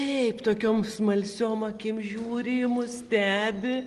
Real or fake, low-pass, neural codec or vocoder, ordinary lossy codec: real; 10.8 kHz; none; Opus, 24 kbps